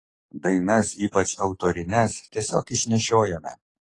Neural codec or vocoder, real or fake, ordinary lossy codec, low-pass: codec, 44.1 kHz, 7.8 kbps, DAC; fake; AAC, 32 kbps; 10.8 kHz